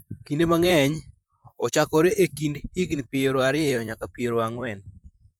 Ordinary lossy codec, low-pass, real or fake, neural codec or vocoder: none; none; fake; vocoder, 44.1 kHz, 128 mel bands, Pupu-Vocoder